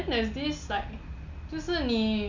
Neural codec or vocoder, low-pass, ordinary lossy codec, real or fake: none; 7.2 kHz; none; real